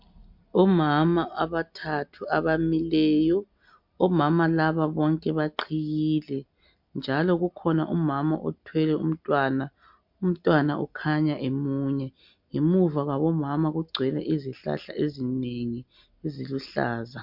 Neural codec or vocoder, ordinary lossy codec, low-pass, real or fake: none; MP3, 48 kbps; 5.4 kHz; real